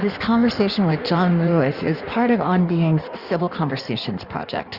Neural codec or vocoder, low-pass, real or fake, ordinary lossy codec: codec, 16 kHz in and 24 kHz out, 1.1 kbps, FireRedTTS-2 codec; 5.4 kHz; fake; Opus, 64 kbps